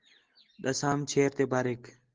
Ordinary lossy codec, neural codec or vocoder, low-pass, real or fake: Opus, 16 kbps; none; 7.2 kHz; real